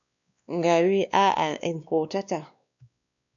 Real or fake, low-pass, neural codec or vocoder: fake; 7.2 kHz; codec, 16 kHz, 2 kbps, X-Codec, WavLM features, trained on Multilingual LibriSpeech